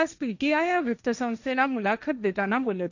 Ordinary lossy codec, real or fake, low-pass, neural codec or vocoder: none; fake; 7.2 kHz; codec, 16 kHz, 1.1 kbps, Voila-Tokenizer